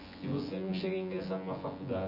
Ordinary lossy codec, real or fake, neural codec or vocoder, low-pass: none; fake; vocoder, 24 kHz, 100 mel bands, Vocos; 5.4 kHz